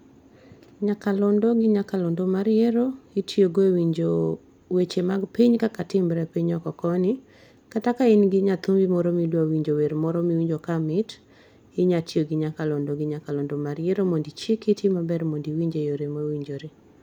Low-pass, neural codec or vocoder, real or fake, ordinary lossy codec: 19.8 kHz; none; real; none